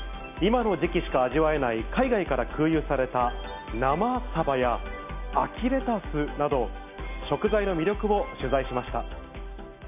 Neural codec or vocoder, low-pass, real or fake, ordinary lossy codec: none; 3.6 kHz; real; none